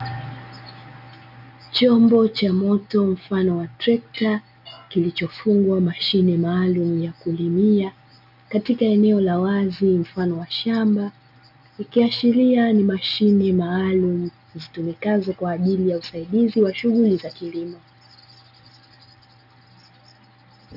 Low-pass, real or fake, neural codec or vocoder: 5.4 kHz; real; none